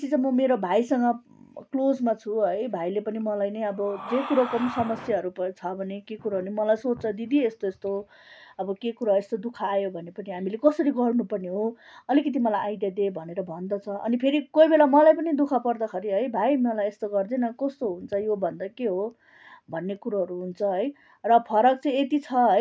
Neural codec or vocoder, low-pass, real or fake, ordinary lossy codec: none; none; real; none